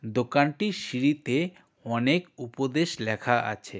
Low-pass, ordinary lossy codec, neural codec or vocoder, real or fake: none; none; none; real